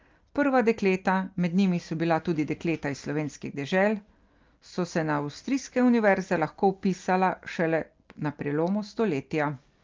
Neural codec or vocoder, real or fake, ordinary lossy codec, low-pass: none; real; Opus, 32 kbps; 7.2 kHz